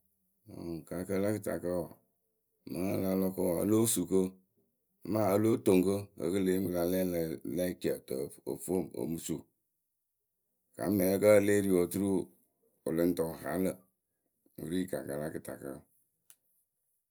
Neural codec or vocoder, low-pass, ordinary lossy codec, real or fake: none; none; none; real